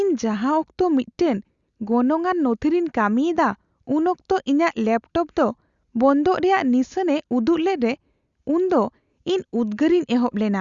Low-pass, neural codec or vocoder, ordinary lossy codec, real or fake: 7.2 kHz; none; Opus, 64 kbps; real